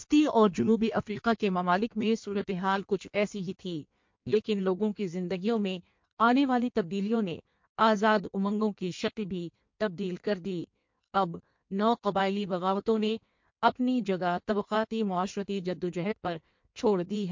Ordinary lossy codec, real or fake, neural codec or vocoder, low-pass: MP3, 48 kbps; fake; codec, 16 kHz in and 24 kHz out, 1.1 kbps, FireRedTTS-2 codec; 7.2 kHz